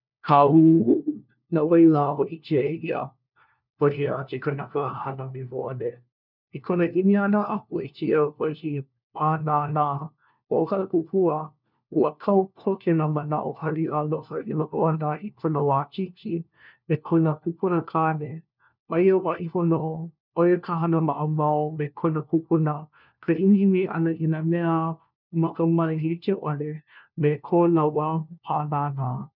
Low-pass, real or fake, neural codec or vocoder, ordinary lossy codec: 5.4 kHz; fake; codec, 16 kHz, 1 kbps, FunCodec, trained on LibriTTS, 50 frames a second; none